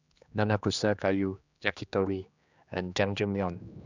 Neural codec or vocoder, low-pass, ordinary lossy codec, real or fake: codec, 16 kHz, 2 kbps, X-Codec, HuBERT features, trained on general audio; 7.2 kHz; none; fake